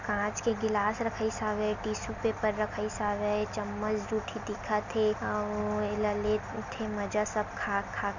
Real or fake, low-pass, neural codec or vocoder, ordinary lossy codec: real; 7.2 kHz; none; none